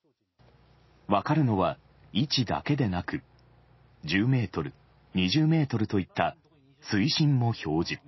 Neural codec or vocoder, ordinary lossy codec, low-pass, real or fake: none; MP3, 24 kbps; 7.2 kHz; real